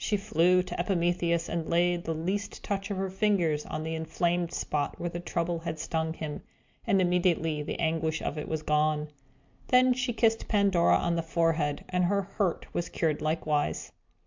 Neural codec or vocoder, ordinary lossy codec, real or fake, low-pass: none; MP3, 64 kbps; real; 7.2 kHz